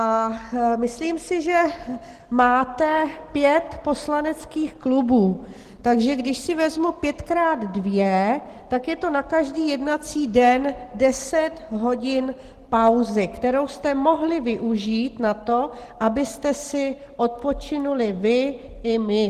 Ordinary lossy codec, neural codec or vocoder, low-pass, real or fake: Opus, 16 kbps; none; 14.4 kHz; real